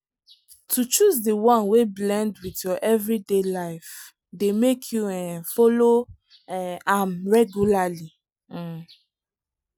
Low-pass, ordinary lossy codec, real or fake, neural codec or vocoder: none; none; real; none